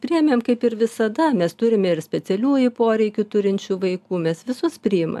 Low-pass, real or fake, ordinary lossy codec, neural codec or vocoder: 14.4 kHz; real; AAC, 96 kbps; none